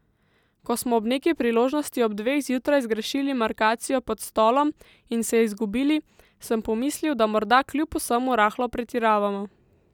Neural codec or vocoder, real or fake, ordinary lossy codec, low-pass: none; real; none; 19.8 kHz